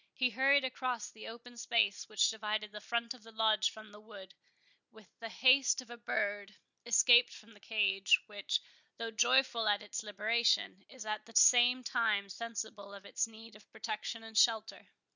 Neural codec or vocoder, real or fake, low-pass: none; real; 7.2 kHz